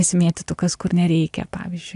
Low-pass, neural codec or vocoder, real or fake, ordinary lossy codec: 10.8 kHz; none; real; AAC, 64 kbps